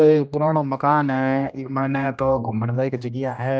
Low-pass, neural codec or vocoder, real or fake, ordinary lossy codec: none; codec, 16 kHz, 1 kbps, X-Codec, HuBERT features, trained on general audio; fake; none